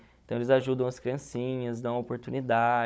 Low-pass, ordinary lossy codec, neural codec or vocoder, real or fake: none; none; codec, 16 kHz, 16 kbps, FunCodec, trained on Chinese and English, 50 frames a second; fake